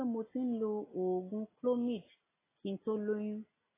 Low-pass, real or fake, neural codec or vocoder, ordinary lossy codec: 3.6 kHz; real; none; AAC, 16 kbps